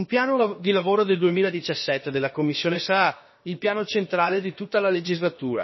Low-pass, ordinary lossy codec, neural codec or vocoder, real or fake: 7.2 kHz; MP3, 24 kbps; codec, 16 kHz, about 1 kbps, DyCAST, with the encoder's durations; fake